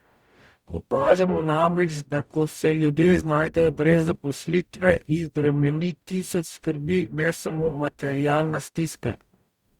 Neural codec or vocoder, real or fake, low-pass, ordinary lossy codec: codec, 44.1 kHz, 0.9 kbps, DAC; fake; 19.8 kHz; Opus, 64 kbps